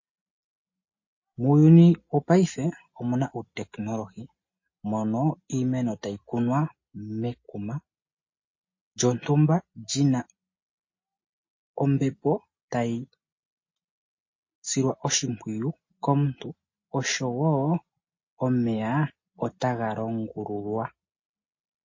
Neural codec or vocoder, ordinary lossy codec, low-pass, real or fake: none; MP3, 32 kbps; 7.2 kHz; real